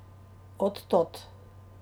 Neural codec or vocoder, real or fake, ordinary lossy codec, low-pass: none; real; none; none